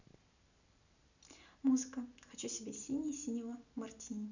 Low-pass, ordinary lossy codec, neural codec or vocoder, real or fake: 7.2 kHz; none; none; real